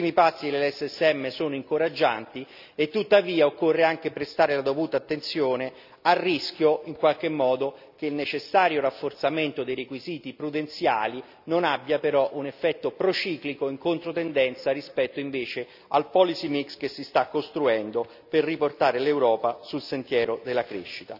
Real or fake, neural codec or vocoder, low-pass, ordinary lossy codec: real; none; 5.4 kHz; none